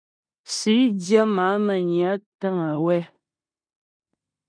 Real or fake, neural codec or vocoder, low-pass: fake; codec, 16 kHz in and 24 kHz out, 0.9 kbps, LongCat-Audio-Codec, four codebook decoder; 9.9 kHz